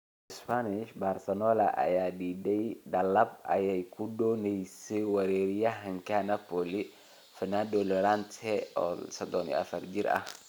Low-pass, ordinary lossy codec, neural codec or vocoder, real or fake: none; none; none; real